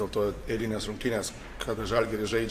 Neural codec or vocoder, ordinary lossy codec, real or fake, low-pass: vocoder, 44.1 kHz, 128 mel bands every 512 samples, BigVGAN v2; AAC, 64 kbps; fake; 14.4 kHz